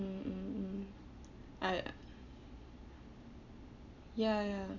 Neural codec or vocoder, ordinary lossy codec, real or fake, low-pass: none; none; real; 7.2 kHz